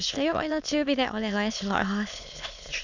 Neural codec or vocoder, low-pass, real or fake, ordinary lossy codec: autoencoder, 22.05 kHz, a latent of 192 numbers a frame, VITS, trained on many speakers; 7.2 kHz; fake; none